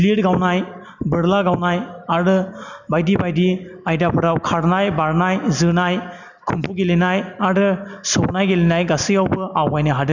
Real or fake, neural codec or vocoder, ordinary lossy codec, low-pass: real; none; none; 7.2 kHz